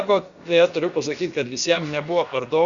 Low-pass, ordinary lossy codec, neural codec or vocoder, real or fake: 7.2 kHz; Opus, 64 kbps; codec, 16 kHz, about 1 kbps, DyCAST, with the encoder's durations; fake